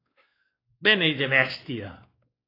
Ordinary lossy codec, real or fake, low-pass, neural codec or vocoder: AAC, 24 kbps; fake; 5.4 kHz; codec, 16 kHz, 2 kbps, X-Codec, WavLM features, trained on Multilingual LibriSpeech